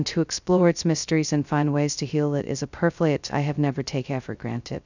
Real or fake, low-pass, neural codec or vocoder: fake; 7.2 kHz; codec, 16 kHz, 0.2 kbps, FocalCodec